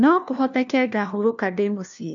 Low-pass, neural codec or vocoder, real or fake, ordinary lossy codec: 7.2 kHz; codec, 16 kHz, 1 kbps, FunCodec, trained on LibriTTS, 50 frames a second; fake; none